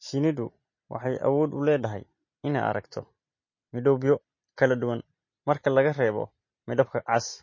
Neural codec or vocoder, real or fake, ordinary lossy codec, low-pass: none; real; MP3, 32 kbps; 7.2 kHz